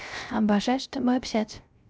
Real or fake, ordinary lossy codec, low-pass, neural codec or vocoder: fake; none; none; codec, 16 kHz, 0.3 kbps, FocalCodec